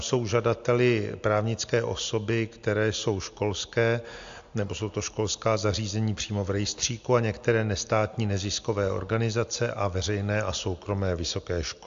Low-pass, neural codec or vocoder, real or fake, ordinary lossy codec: 7.2 kHz; none; real; AAC, 64 kbps